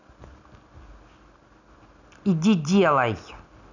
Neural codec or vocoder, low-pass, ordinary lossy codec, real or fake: none; 7.2 kHz; none; real